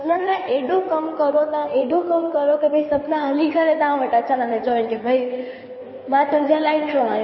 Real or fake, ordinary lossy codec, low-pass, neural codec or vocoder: fake; MP3, 24 kbps; 7.2 kHz; codec, 16 kHz in and 24 kHz out, 2.2 kbps, FireRedTTS-2 codec